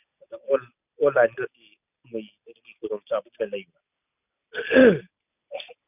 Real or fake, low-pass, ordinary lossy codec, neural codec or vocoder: real; 3.6 kHz; none; none